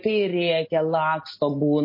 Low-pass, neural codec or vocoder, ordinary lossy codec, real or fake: 5.4 kHz; none; MP3, 24 kbps; real